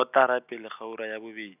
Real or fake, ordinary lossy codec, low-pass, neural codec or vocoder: real; none; 3.6 kHz; none